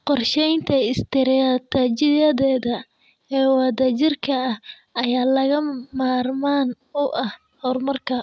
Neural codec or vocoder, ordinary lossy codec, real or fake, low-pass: none; none; real; none